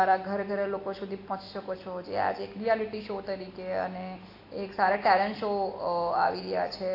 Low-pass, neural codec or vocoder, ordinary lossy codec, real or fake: 5.4 kHz; none; AAC, 32 kbps; real